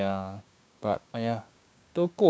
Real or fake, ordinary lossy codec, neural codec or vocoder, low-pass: fake; none; codec, 16 kHz, 6 kbps, DAC; none